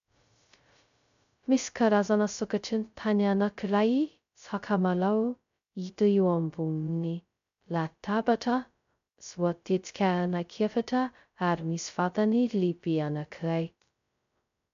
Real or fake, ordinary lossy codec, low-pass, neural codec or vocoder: fake; MP3, 64 kbps; 7.2 kHz; codec, 16 kHz, 0.2 kbps, FocalCodec